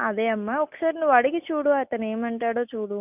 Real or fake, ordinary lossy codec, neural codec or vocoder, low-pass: real; none; none; 3.6 kHz